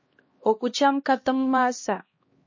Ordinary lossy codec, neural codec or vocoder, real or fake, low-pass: MP3, 32 kbps; codec, 16 kHz, 1 kbps, X-Codec, HuBERT features, trained on LibriSpeech; fake; 7.2 kHz